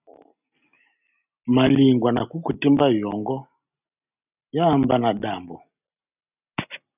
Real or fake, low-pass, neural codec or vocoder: real; 3.6 kHz; none